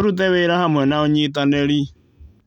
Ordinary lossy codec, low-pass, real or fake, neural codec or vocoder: none; 19.8 kHz; real; none